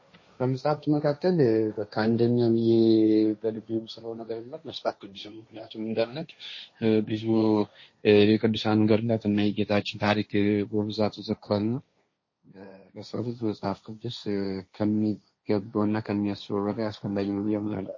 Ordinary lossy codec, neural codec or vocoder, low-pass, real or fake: MP3, 32 kbps; codec, 16 kHz, 1.1 kbps, Voila-Tokenizer; 7.2 kHz; fake